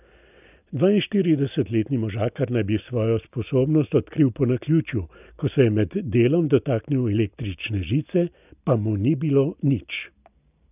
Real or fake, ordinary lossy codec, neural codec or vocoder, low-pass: real; none; none; 3.6 kHz